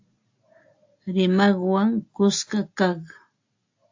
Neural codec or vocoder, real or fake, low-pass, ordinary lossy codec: none; real; 7.2 kHz; AAC, 48 kbps